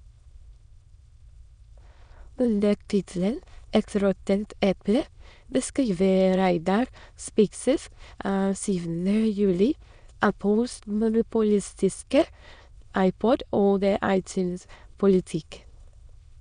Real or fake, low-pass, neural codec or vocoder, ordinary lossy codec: fake; 9.9 kHz; autoencoder, 22.05 kHz, a latent of 192 numbers a frame, VITS, trained on many speakers; none